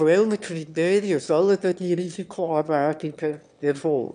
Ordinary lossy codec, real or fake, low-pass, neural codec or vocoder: none; fake; 9.9 kHz; autoencoder, 22.05 kHz, a latent of 192 numbers a frame, VITS, trained on one speaker